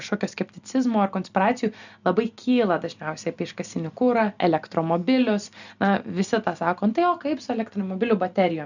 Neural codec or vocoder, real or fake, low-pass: none; real; 7.2 kHz